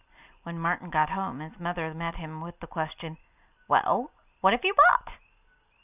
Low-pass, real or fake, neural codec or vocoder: 3.6 kHz; real; none